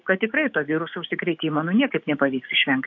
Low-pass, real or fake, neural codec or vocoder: 7.2 kHz; fake; codec, 16 kHz, 6 kbps, DAC